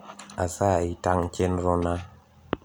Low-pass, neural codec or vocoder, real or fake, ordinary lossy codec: none; none; real; none